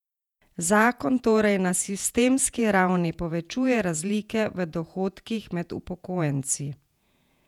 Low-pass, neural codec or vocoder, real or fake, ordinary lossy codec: 19.8 kHz; vocoder, 48 kHz, 128 mel bands, Vocos; fake; none